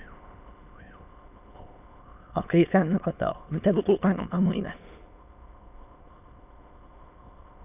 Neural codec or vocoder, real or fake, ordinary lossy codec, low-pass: autoencoder, 22.05 kHz, a latent of 192 numbers a frame, VITS, trained on many speakers; fake; none; 3.6 kHz